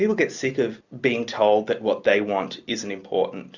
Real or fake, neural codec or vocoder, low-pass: real; none; 7.2 kHz